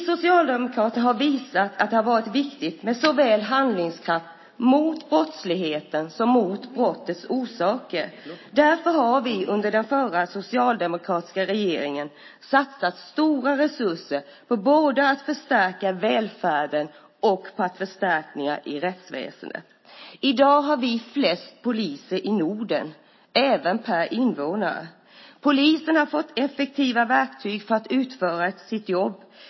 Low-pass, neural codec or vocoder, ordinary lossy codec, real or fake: 7.2 kHz; none; MP3, 24 kbps; real